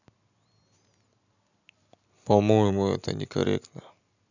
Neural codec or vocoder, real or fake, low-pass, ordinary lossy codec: none; real; 7.2 kHz; none